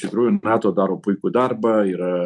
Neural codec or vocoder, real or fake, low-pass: none; real; 10.8 kHz